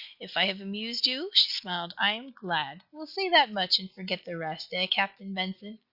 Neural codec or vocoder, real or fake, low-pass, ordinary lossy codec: none; real; 5.4 kHz; Opus, 64 kbps